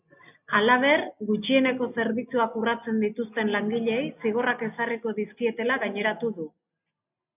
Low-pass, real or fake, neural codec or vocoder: 3.6 kHz; real; none